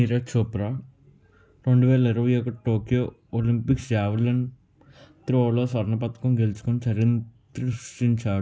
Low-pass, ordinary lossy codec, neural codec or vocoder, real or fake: none; none; none; real